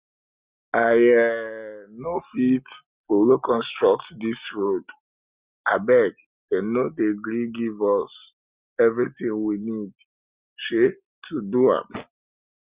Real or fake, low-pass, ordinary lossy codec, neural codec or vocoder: real; 3.6 kHz; Opus, 64 kbps; none